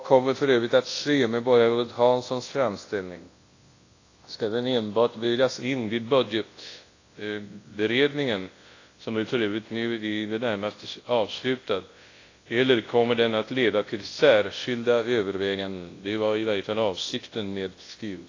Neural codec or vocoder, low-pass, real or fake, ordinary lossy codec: codec, 24 kHz, 0.9 kbps, WavTokenizer, large speech release; 7.2 kHz; fake; AAC, 32 kbps